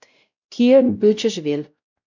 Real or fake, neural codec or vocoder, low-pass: fake; codec, 16 kHz, 0.5 kbps, X-Codec, WavLM features, trained on Multilingual LibriSpeech; 7.2 kHz